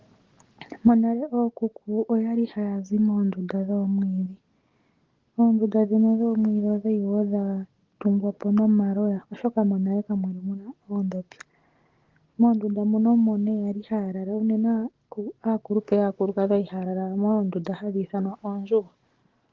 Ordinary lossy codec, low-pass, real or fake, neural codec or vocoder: Opus, 16 kbps; 7.2 kHz; real; none